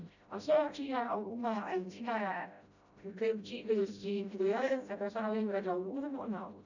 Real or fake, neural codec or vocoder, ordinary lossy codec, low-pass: fake; codec, 16 kHz, 0.5 kbps, FreqCodec, smaller model; none; 7.2 kHz